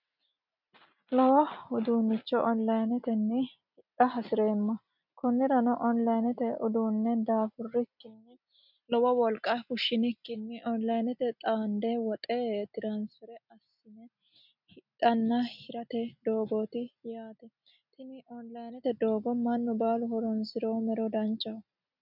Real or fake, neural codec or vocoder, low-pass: real; none; 5.4 kHz